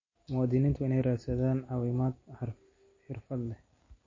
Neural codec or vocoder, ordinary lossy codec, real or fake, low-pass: none; MP3, 32 kbps; real; 7.2 kHz